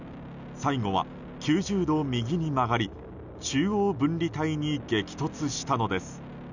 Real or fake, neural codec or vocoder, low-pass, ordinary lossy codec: real; none; 7.2 kHz; none